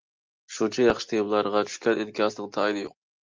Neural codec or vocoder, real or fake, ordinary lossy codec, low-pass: none; real; Opus, 24 kbps; 7.2 kHz